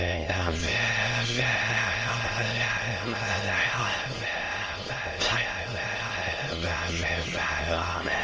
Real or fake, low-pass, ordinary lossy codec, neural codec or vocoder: fake; 7.2 kHz; Opus, 24 kbps; autoencoder, 22.05 kHz, a latent of 192 numbers a frame, VITS, trained on many speakers